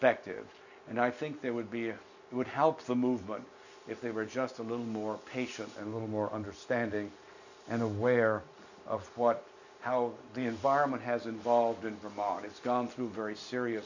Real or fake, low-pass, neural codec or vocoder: real; 7.2 kHz; none